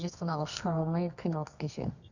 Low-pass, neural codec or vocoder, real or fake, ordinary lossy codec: 7.2 kHz; codec, 24 kHz, 0.9 kbps, WavTokenizer, medium music audio release; fake; none